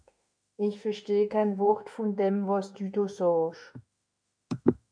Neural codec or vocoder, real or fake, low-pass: autoencoder, 48 kHz, 32 numbers a frame, DAC-VAE, trained on Japanese speech; fake; 9.9 kHz